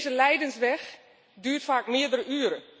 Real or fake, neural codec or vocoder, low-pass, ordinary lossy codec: real; none; none; none